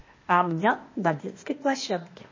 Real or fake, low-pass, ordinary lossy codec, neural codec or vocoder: fake; 7.2 kHz; MP3, 32 kbps; codec, 16 kHz, 0.8 kbps, ZipCodec